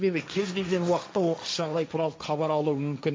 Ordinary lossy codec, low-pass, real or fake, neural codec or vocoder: none; none; fake; codec, 16 kHz, 1.1 kbps, Voila-Tokenizer